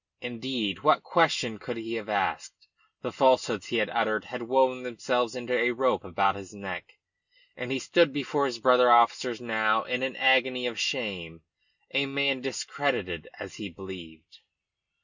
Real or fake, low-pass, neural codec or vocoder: real; 7.2 kHz; none